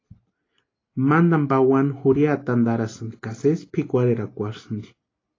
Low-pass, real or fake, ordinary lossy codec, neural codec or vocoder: 7.2 kHz; real; AAC, 32 kbps; none